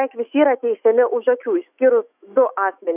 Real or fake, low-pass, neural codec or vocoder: fake; 3.6 kHz; autoencoder, 48 kHz, 128 numbers a frame, DAC-VAE, trained on Japanese speech